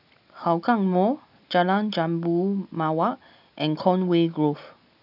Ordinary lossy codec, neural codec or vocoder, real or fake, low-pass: none; none; real; 5.4 kHz